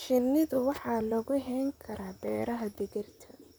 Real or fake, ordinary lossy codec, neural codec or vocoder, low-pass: fake; none; vocoder, 44.1 kHz, 128 mel bands, Pupu-Vocoder; none